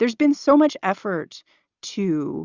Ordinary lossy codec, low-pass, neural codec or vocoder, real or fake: Opus, 64 kbps; 7.2 kHz; none; real